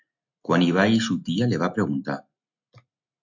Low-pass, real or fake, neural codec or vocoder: 7.2 kHz; real; none